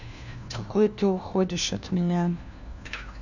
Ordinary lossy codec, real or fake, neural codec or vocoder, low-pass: none; fake; codec, 16 kHz, 1 kbps, FunCodec, trained on LibriTTS, 50 frames a second; 7.2 kHz